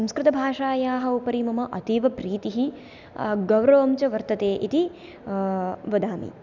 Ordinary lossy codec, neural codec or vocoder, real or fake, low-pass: none; none; real; 7.2 kHz